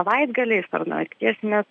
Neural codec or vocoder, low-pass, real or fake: none; 9.9 kHz; real